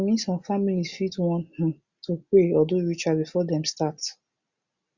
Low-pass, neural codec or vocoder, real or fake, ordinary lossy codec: 7.2 kHz; none; real; Opus, 64 kbps